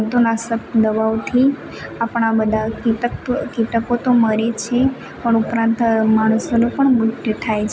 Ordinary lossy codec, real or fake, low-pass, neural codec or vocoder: none; real; none; none